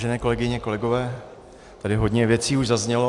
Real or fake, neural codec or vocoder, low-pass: fake; vocoder, 44.1 kHz, 128 mel bands every 256 samples, BigVGAN v2; 10.8 kHz